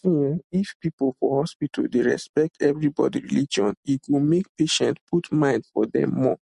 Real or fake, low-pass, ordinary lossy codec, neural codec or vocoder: real; 10.8 kHz; MP3, 48 kbps; none